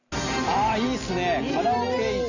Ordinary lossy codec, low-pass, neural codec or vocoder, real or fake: none; 7.2 kHz; none; real